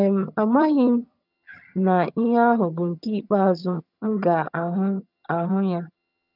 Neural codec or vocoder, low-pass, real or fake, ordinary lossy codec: vocoder, 22.05 kHz, 80 mel bands, HiFi-GAN; 5.4 kHz; fake; none